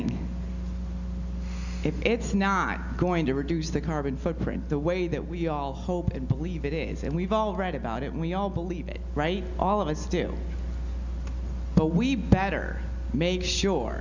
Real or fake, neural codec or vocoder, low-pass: real; none; 7.2 kHz